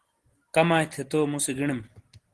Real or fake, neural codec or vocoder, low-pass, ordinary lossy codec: real; none; 10.8 kHz; Opus, 16 kbps